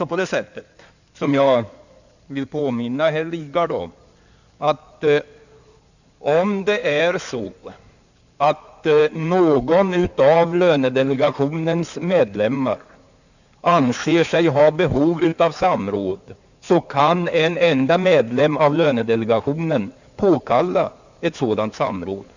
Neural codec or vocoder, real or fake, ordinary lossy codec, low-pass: codec, 16 kHz in and 24 kHz out, 2.2 kbps, FireRedTTS-2 codec; fake; none; 7.2 kHz